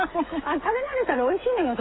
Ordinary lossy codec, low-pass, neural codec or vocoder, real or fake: AAC, 16 kbps; 7.2 kHz; codec, 16 kHz, 16 kbps, FreqCodec, smaller model; fake